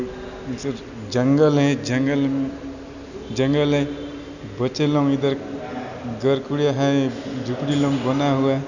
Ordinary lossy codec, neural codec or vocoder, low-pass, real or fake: none; none; 7.2 kHz; real